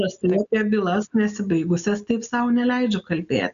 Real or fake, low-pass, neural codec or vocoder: real; 7.2 kHz; none